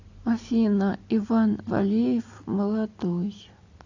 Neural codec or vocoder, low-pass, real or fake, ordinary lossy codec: none; 7.2 kHz; real; AAC, 48 kbps